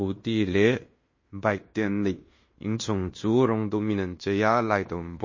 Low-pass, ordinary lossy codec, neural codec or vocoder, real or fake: 7.2 kHz; MP3, 32 kbps; codec, 16 kHz in and 24 kHz out, 0.9 kbps, LongCat-Audio-Codec, fine tuned four codebook decoder; fake